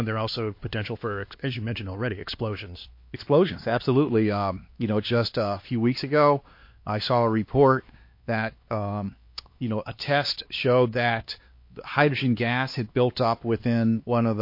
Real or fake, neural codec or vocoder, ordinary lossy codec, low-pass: fake; codec, 16 kHz, 2 kbps, X-Codec, HuBERT features, trained on LibriSpeech; MP3, 32 kbps; 5.4 kHz